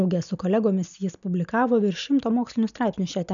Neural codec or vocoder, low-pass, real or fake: none; 7.2 kHz; real